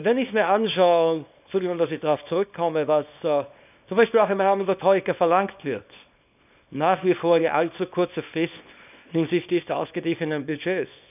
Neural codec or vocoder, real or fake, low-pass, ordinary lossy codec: codec, 24 kHz, 0.9 kbps, WavTokenizer, small release; fake; 3.6 kHz; none